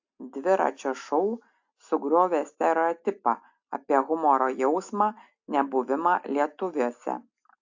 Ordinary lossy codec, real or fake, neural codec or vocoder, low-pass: AAC, 48 kbps; real; none; 7.2 kHz